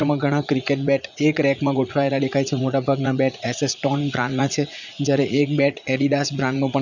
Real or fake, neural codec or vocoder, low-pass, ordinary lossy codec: fake; vocoder, 44.1 kHz, 80 mel bands, Vocos; 7.2 kHz; none